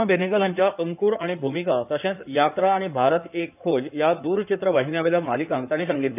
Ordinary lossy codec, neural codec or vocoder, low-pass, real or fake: none; codec, 16 kHz in and 24 kHz out, 2.2 kbps, FireRedTTS-2 codec; 3.6 kHz; fake